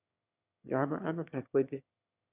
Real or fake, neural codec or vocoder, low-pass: fake; autoencoder, 22.05 kHz, a latent of 192 numbers a frame, VITS, trained on one speaker; 3.6 kHz